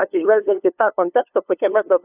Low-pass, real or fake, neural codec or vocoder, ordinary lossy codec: 3.6 kHz; fake; codec, 16 kHz, 2 kbps, FunCodec, trained on LibriTTS, 25 frames a second; AAC, 32 kbps